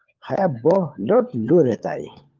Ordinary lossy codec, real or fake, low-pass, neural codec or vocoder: Opus, 32 kbps; fake; 7.2 kHz; codec, 16 kHz, 4 kbps, FunCodec, trained on LibriTTS, 50 frames a second